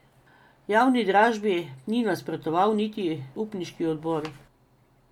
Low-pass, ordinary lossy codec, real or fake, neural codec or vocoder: 19.8 kHz; MP3, 96 kbps; real; none